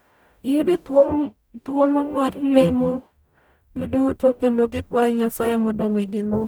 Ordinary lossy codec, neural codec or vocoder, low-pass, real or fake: none; codec, 44.1 kHz, 0.9 kbps, DAC; none; fake